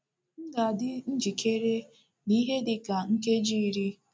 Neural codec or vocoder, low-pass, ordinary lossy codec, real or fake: none; none; none; real